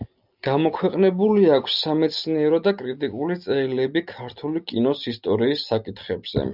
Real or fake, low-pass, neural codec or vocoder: real; 5.4 kHz; none